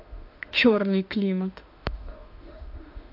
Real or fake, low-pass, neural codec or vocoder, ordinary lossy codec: fake; 5.4 kHz; autoencoder, 48 kHz, 32 numbers a frame, DAC-VAE, trained on Japanese speech; AAC, 48 kbps